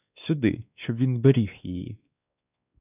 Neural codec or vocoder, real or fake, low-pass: codec, 16 kHz, 2 kbps, X-Codec, WavLM features, trained on Multilingual LibriSpeech; fake; 3.6 kHz